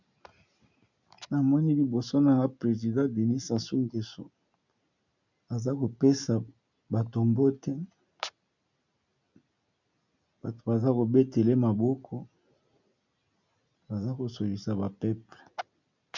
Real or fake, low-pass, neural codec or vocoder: real; 7.2 kHz; none